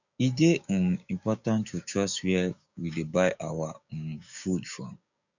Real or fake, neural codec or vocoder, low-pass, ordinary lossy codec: fake; codec, 16 kHz, 6 kbps, DAC; 7.2 kHz; none